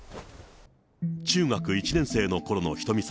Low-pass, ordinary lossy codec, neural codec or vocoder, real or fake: none; none; none; real